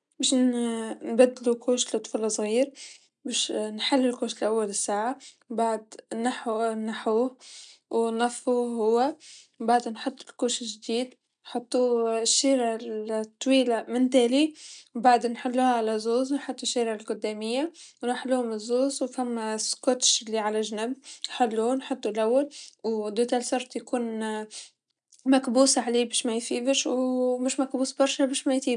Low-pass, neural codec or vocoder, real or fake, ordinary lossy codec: 9.9 kHz; none; real; none